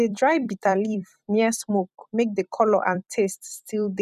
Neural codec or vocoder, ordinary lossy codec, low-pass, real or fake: vocoder, 44.1 kHz, 128 mel bands every 256 samples, BigVGAN v2; none; 14.4 kHz; fake